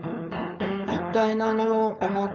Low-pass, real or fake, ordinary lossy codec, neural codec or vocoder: 7.2 kHz; fake; none; codec, 16 kHz, 4.8 kbps, FACodec